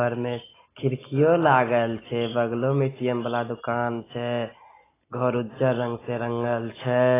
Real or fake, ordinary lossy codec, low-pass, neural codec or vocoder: real; AAC, 16 kbps; 3.6 kHz; none